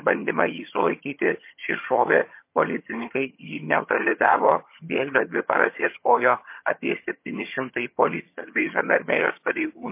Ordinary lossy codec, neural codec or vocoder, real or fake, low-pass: MP3, 24 kbps; vocoder, 22.05 kHz, 80 mel bands, HiFi-GAN; fake; 3.6 kHz